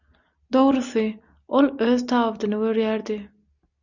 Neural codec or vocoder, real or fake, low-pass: none; real; 7.2 kHz